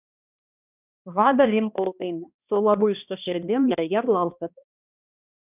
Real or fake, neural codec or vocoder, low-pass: fake; codec, 16 kHz, 1 kbps, X-Codec, HuBERT features, trained on balanced general audio; 3.6 kHz